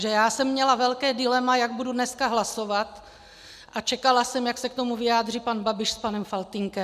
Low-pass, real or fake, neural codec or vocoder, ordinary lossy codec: 14.4 kHz; real; none; MP3, 96 kbps